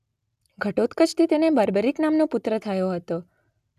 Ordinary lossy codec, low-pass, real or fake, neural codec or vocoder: none; 14.4 kHz; real; none